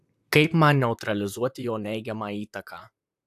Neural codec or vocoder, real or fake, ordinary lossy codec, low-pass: vocoder, 44.1 kHz, 128 mel bands, Pupu-Vocoder; fake; AAC, 96 kbps; 14.4 kHz